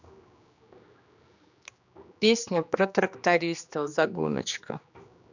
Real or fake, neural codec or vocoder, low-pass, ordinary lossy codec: fake; codec, 16 kHz, 2 kbps, X-Codec, HuBERT features, trained on general audio; 7.2 kHz; none